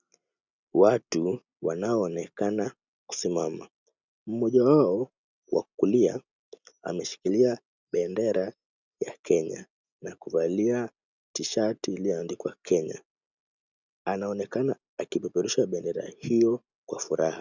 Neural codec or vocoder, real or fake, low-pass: none; real; 7.2 kHz